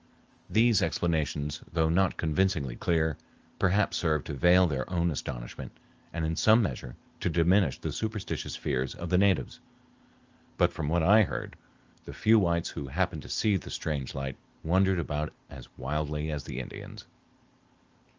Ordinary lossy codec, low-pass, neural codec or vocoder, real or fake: Opus, 24 kbps; 7.2 kHz; none; real